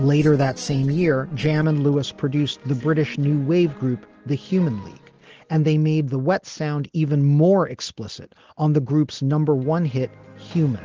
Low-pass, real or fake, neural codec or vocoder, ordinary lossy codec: 7.2 kHz; real; none; Opus, 16 kbps